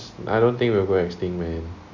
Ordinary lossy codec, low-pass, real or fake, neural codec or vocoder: none; 7.2 kHz; real; none